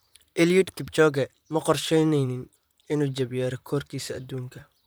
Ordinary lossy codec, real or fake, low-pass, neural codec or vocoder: none; fake; none; vocoder, 44.1 kHz, 128 mel bands, Pupu-Vocoder